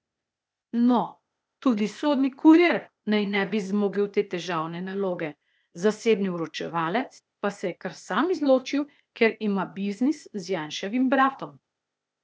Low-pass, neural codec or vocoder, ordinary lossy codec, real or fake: none; codec, 16 kHz, 0.8 kbps, ZipCodec; none; fake